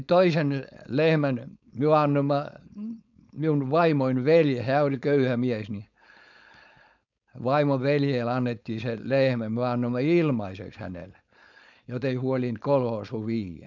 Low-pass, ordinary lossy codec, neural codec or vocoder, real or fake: 7.2 kHz; none; codec, 16 kHz, 4.8 kbps, FACodec; fake